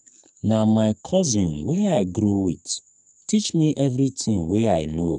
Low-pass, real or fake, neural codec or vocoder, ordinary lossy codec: 10.8 kHz; fake; codec, 44.1 kHz, 2.6 kbps, SNAC; none